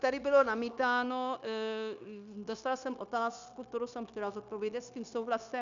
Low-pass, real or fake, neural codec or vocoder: 7.2 kHz; fake; codec, 16 kHz, 0.9 kbps, LongCat-Audio-Codec